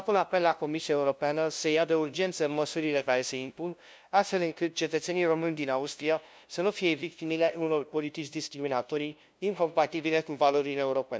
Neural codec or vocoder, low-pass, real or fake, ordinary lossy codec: codec, 16 kHz, 0.5 kbps, FunCodec, trained on LibriTTS, 25 frames a second; none; fake; none